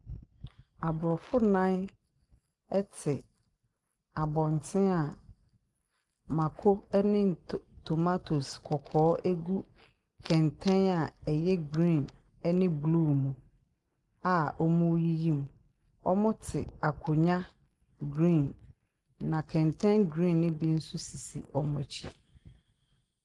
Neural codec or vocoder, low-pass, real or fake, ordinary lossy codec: none; 10.8 kHz; real; Opus, 24 kbps